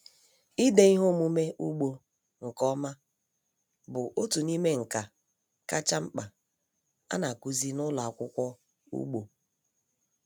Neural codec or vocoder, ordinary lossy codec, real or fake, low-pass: none; none; real; none